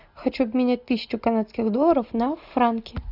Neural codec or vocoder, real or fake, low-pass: none; real; 5.4 kHz